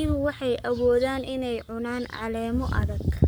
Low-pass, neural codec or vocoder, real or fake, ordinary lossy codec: none; codec, 44.1 kHz, 7.8 kbps, Pupu-Codec; fake; none